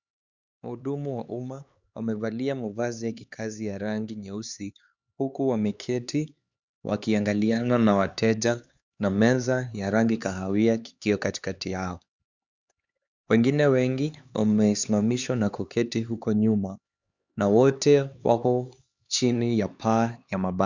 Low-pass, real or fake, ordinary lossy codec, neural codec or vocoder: 7.2 kHz; fake; Opus, 64 kbps; codec, 16 kHz, 4 kbps, X-Codec, HuBERT features, trained on LibriSpeech